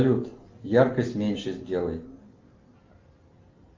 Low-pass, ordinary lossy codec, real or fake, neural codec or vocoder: 7.2 kHz; Opus, 32 kbps; real; none